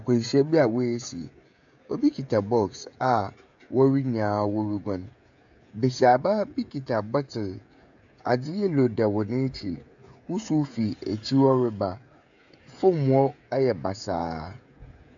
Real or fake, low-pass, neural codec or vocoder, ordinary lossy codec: fake; 7.2 kHz; codec, 16 kHz, 16 kbps, FreqCodec, smaller model; AAC, 64 kbps